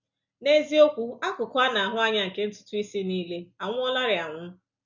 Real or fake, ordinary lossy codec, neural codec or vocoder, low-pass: real; none; none; 7.2 kHz